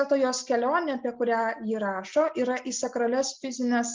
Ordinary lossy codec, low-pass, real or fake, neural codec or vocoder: Opus, 32 kbps; 7.2 kHz; real; none